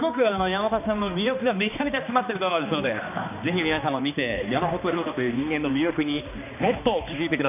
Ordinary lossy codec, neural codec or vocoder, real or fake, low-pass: none; codec, 16 kHz, 2 kbps, X-Codec, HuBERT features, trained on balanced general audio; fake; 3.6 kHz